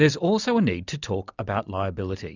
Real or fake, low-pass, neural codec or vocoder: real; 7.2 kHz; none